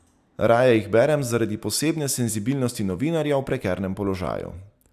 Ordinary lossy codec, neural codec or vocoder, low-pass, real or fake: none; none; 14.4 kHz; real